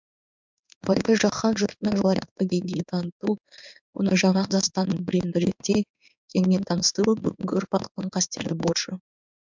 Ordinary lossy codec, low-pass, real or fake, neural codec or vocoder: MP3, 64 kbps; 7.2 kHz; fake; codec, 16 kHz in and 24 kHz out, 1 kbps, XY-Tokenizer